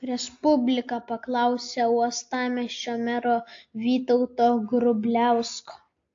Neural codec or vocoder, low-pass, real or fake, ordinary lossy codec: none; 7.2 kHz; real; AAC, 48 kbps